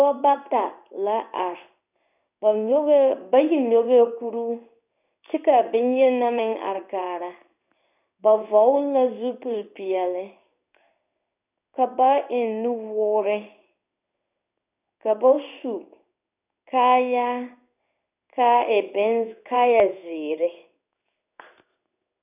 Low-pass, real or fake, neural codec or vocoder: 3.6 kHz; fake; codec, 16 kHz in and 24 kHz out, 1 kbps, XY-Tokenizer